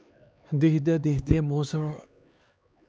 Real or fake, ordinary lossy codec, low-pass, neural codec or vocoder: fake; none; none; codec, 16 kHz, 2 kbps, X-Codec, HuBERT features, trained on LibriSpeech